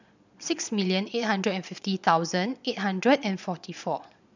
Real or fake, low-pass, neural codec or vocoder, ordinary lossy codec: fake; 7.2 kHz; vocoder, 22.05 kHz, 80 mel bands, Vocos; none